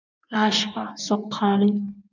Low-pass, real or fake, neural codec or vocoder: 7.2 kHz; fake; codec, 16 kHz in and 24 kHz out, 1.1 kbps, FireRedTTS-2 codec